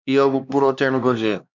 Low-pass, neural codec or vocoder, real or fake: 7.2 kHz; codec, 16 kHz, 2 kbps, X-Codec, HuBERT features, trained on LibriSpeech; fake